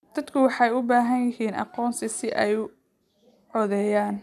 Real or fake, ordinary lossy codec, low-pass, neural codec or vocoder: real; none; 14.4 kHz; none